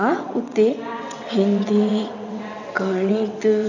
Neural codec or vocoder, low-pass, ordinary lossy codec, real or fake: vocoder, 22.05 kHz, 80 mel bands, WaveNeXt; 7.2 kHz; none; fake